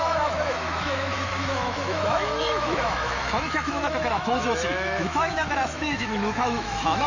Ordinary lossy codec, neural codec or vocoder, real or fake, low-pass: AAC, 48 kbps; autoencoder, 48 kHz, 128 numbers a frame, DAC-VAE, trained on Japanese speech; fake; 7.2 kHz